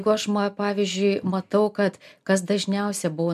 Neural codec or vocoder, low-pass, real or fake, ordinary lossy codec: none; 14.4 kHz; real; MP3, 96 kbps